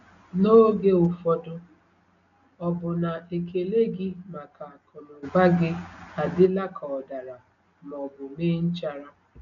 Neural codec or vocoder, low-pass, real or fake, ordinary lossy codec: none; 7.2 kHz; real; none